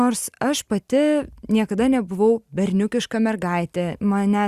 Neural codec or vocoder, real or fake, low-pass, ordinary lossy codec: none; real; 14.4 kHz; Opus, 64 kbps